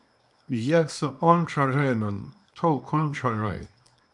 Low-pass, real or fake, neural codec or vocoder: 10.8 kHz; fake; codec, 24 kHz, 0.9 kbps, WavTokenizer, small release